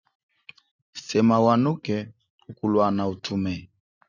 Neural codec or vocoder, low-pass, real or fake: none; 7.2 kHz; real